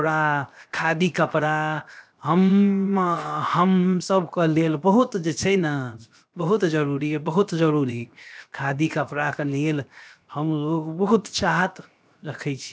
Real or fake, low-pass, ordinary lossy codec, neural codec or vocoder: fake; none; none; codec, 16 kHz, 0.7 kbps, FocalCodec